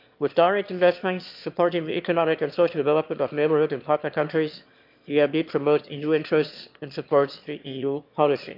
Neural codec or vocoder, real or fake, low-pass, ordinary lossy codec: autoencoder, 22.05 kHz, a latent of 192 numbers a frame, VITS, trained on one speaker; fake; 5.4 kHz; MP3, 48 kbps